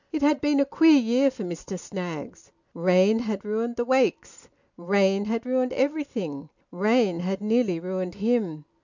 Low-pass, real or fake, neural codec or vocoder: 7.2 kHz; real; none